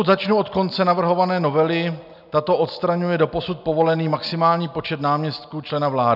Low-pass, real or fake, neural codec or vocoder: 5.4 kHz; real; none